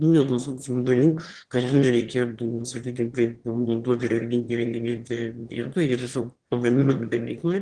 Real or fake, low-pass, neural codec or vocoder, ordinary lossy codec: fake; 9.9 kHz; autoencoder, 22.05 kHz, a latent of 192 numbers a frame, VITS, trained on one speaker; Opus, 16 kbps